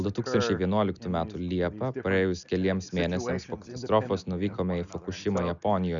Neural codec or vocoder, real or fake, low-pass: none; real; 7.2 kHz